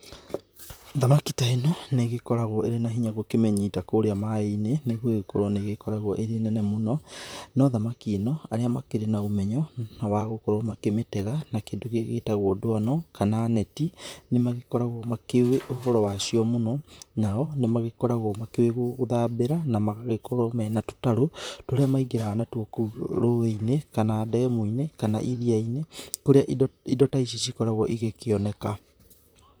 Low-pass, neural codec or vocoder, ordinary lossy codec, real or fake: none; vocoder, 44.1 kHz, 128 mel bands, Pupu-Vocoder; none; fake